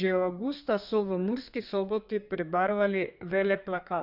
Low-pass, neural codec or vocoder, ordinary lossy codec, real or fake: 5.4 kHz; codec, 32 kHz, 1.9 kbps, SNAC; none; fake